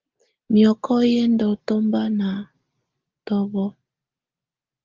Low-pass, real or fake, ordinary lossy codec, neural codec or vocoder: 7.2 kHz; real; Opus, 32 kbps; none